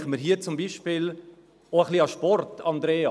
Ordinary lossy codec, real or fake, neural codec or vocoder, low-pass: none; real; none; none